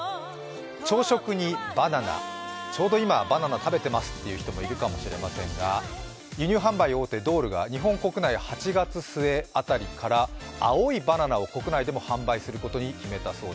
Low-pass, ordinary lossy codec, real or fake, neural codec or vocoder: none; none; real; none